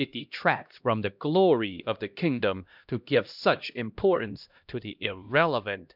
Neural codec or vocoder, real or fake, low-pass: codec, 16 kHz, 1 kbps, X-Codec, HuBERT features, trained on LibriSpeech; fake; 5.4 kHz